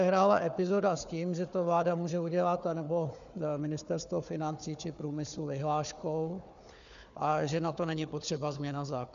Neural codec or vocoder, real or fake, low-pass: codec, 16 kHz, 4 kbps, FunCodec, trained on Chinese and English, 50 frames a second; fake; 7.2 kHz